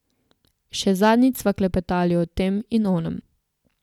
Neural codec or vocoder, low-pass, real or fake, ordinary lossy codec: none; 19.8 kHz; real; none